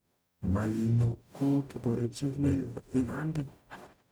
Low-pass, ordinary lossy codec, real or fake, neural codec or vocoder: none; none; fake; codec, 44.1 kHz, 0.9 kbps, DAC